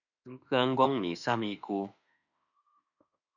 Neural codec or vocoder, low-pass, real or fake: autoencoder, 48 kHz, 32 numbers a frame, DAC-VAE, trained on Japanese speech; 7.2 kHz; fake